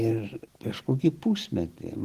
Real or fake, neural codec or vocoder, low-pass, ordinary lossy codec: real; none; 14.4 kHz; Opus, 16 kbps